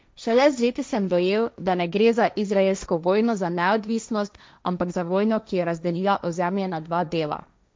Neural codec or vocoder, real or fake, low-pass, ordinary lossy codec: codec, 16 kHz, 1.1 kbps, Voila-Tokenizer; fake; none; none